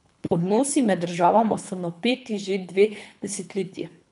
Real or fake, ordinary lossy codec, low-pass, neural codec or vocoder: fake; none; 10.8 kHz; codec, 24 kHz, 3 kbps, HILCodec